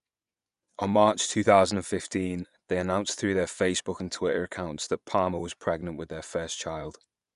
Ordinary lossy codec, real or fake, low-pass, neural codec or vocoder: none; fake; 10.8 kHz; vocoder, 24 kHz, 100 mel bands, Vocos